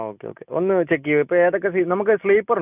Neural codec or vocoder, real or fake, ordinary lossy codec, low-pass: none; real; none; 3.6 kHz